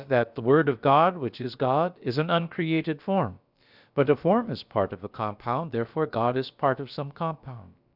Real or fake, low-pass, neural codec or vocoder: fake; 5.4 kHz; codec, 16 kHz, about 1 kbps, DyCAST, with the encoder's durations